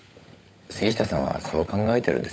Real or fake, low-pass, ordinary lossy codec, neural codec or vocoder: fake; none; none; codec, 16 kHz, 16 kbps, FunCodec, trained on LibriTTS, 50 frames a second